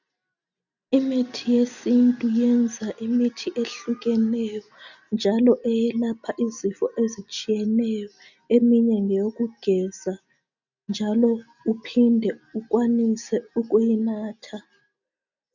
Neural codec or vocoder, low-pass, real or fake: none; 7.2 kHz; real